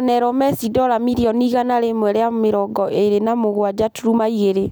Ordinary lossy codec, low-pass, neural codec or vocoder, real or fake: none; none; none; real